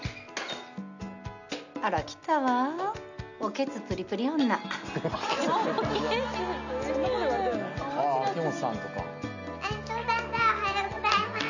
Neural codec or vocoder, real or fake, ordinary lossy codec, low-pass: none; real; none; 7.2 kHz